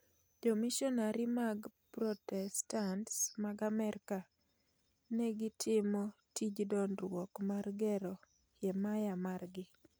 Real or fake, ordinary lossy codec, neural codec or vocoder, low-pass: real; none; none; none